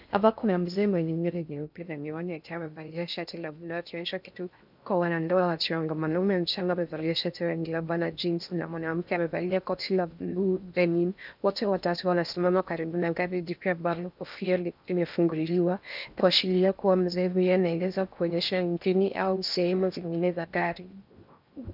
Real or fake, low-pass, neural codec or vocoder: fake; 5.4 kHz; codec, 16 kHz in and 24 kHz out, 0.6 kbps, FocalCodec, streaming, 4096 codes